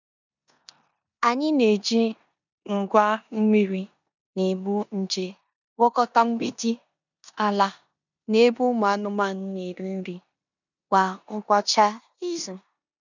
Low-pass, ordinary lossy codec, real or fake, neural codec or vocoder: 7.2 kHz; none; fake; codec, 16 kHz in and 24 kHz out, 0.9 kbps, LongCat-Audio-Codec, four codebook decoder